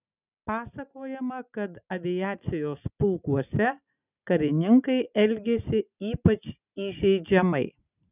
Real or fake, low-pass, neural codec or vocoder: real; 3.6 kHz; none